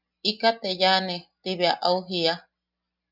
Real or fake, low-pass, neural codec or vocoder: real; 5.4 kHz; none